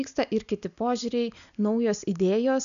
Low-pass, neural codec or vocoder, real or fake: 7.2 kHz; none; real